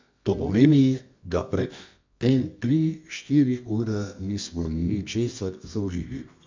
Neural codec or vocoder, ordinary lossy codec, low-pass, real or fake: codec, 24 kHz, 0.9 kbps, WavTokenizer, medium music audio release; MP3, 64 kbps; 7.2 kHz; fake